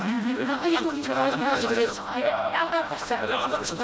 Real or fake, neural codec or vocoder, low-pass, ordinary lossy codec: fake; codec, 16 kHz, 0.5 kbps, FreqCodec, smaller model; none; none